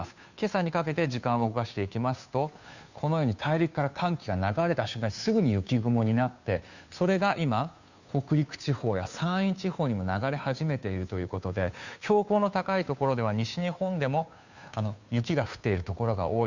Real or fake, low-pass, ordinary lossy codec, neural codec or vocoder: fake; 7.2 kHz; none; codec, 16 kHz, 2 kbps, FunCodec, trained on Chinese and English, 25 frames a second